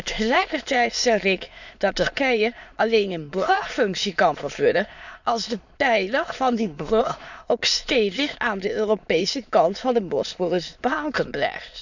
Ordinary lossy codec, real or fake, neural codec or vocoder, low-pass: none; fake; autoencoder, 22.05 kHz, a latent of 192 numbers a frame, VITS, trained on many speakers; 7.2 kHz